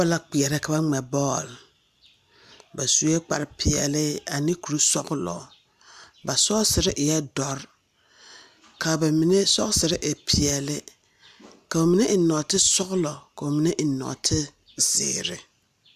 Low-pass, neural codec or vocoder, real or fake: 14.4 kHz; none; real